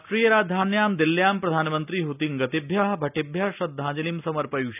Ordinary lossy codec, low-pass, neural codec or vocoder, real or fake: none; 3.6 kHz; none; real